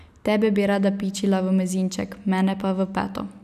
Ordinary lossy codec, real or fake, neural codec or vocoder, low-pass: none; real; none; 14.4 kHz